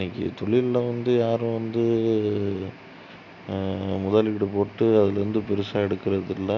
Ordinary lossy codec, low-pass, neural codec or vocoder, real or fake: none; 7.2 kHz; none; real